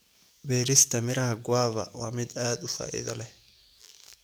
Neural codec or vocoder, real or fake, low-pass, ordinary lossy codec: codec, 44.1 kHz, 7.8 kbps, DAC; fake; none; none